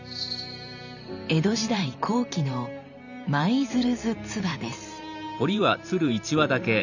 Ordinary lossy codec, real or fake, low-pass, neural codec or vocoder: none; fake; 7.2 kHz; vocoder, 44.1 kHz, 128 mel bands every 512 samples, BigVGAN v2